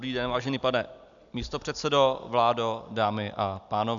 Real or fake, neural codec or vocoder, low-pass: real; none; 7.2 kHz